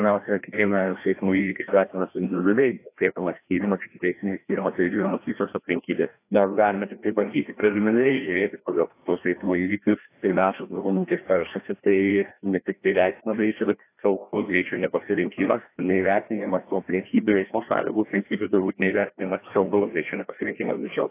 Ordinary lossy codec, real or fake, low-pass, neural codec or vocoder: AAC, 24 kbps; fake; 3.6 kHz; codec, 16 kHz, 1 kbps, FreqCodec, larger model